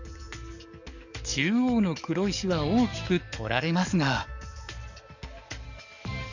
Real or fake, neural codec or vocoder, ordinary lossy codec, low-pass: fake; codec, 44.1 kHz, 7.8 kbps, DAC; none; 7.2 kHz